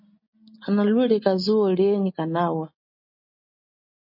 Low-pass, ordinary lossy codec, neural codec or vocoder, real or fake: 5.4 kHz; MP3, 32 kbps; none; real